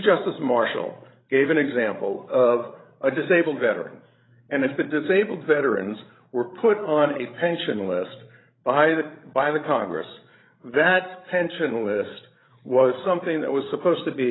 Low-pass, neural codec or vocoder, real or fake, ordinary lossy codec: 7.2 kHz; codec, 16 kHz, 16 kbps, FreqCodec, larger model; fake; AAC, 16 kbps